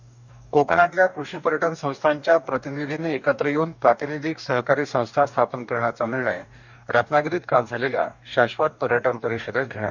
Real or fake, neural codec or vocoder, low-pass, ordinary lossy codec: fake; codec, 44.1 kHz, 2.6 kbps, DAC; 7.2 kHz; none